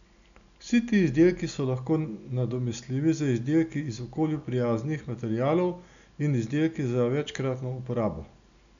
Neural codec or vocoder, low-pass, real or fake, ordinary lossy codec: none; 7.2 kHz; real; none